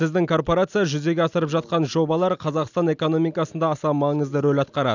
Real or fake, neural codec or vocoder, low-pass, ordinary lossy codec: real; none; 7.2 kHz; none